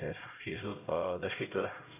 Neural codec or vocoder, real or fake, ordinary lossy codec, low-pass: codec, 16 kHz, 0.5 kbps, X-Codec, HuBERT features, trained on LibriSpeech; fake; none; 3.6 kHz